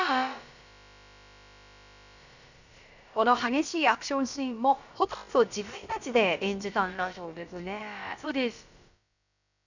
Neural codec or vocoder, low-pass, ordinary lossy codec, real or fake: codec, 16 kHz, about 1 kbps, DyCAST, with the encoder's durations; 7.2 kHz; none; fake